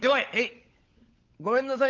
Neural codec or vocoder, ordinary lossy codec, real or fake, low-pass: codec, 16 kHz, 16 kbps, FunCodec, trained on LibriTTS, 50 frames a second; Opus, 32 kbps; fake; 7.2 kHz